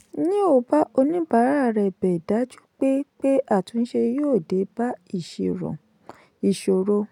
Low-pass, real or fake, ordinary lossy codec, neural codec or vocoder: 19.8 kHz; real; none; none